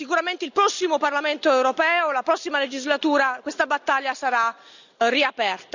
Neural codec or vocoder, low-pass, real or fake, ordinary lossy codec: none; 7.2 kHz; real; none